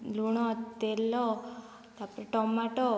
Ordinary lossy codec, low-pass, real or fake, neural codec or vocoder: none; none; real; none